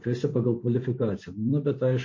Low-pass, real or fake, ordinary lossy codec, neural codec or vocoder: 7.2 kHz; fake; MP3, 32 kbps; vocoder, 44.1 kHz, 128 mel bands every 256 samples, BigVGAN v2